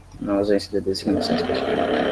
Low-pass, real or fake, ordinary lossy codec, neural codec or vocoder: 10.8 kHz; real; Opus, 16 kbps; none